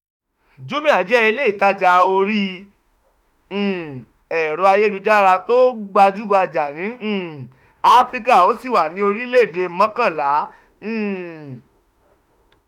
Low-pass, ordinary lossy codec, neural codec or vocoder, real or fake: 19.8 kHz; none; autoencoder, 48 kHz, 32 numbers a frame, DAC-VAE, trained on Japanese speech; fake